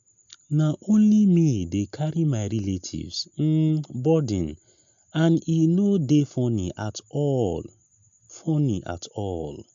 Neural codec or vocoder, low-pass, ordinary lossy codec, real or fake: none; 7.2 kHz; MP3, 64 kbps; real